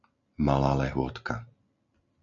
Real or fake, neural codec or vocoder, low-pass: real; none; 7.2 kHz